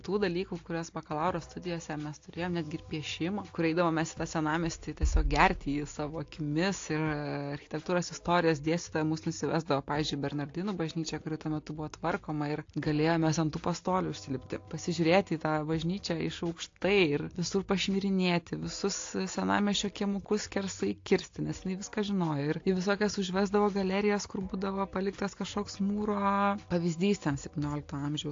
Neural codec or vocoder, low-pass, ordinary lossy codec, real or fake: none; 7.2 kHz; AAC, 48 kbps; real